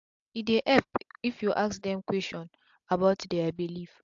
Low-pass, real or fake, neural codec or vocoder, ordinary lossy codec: 7.2 kHz; real; none; AAC, 64 kbps